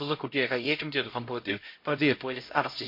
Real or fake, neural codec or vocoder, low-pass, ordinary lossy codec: fake; codec, 16 kHz, 0.5 kbps, X-Codec, HuBERT features, trained on LibriSpeech; 5.4 kHz; MP3, 32 kbps